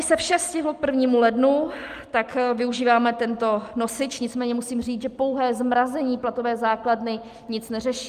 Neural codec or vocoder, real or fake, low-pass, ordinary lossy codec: none; real; 14.4 kHz; Opus, 32 kbps